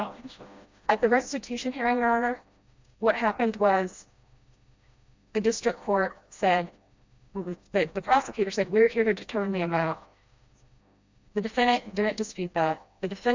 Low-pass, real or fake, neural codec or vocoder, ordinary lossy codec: 7.2 kHz; fake; codec, 16 kHz, 1 kbps, FreqCodec, smaller model; MP3, 64 kbps